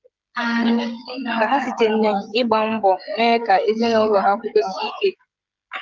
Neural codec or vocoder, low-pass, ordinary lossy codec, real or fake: codec, 16 kHz, 16 kbps, FreqCodec, smaller model; 7.2 kHz; Opus, 24 kbps; fake